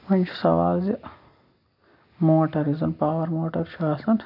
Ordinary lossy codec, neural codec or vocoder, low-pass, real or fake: none; none; 5.4 kHz; real